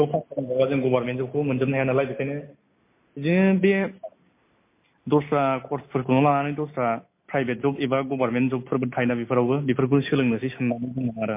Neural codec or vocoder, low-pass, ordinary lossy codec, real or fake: none; 3.6 kHz; MP3, 24 kbps; real